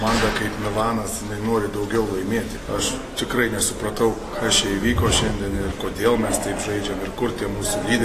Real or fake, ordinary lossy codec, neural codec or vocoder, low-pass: real; AAC, 48 kbps; none; 14.4 kHz